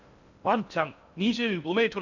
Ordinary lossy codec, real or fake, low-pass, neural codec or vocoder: none; fake; 7.2 kHz; codec, 16 kHz in and 24 kHz out, 0.6 kbps, FocalCodec, streaming, 2048 codes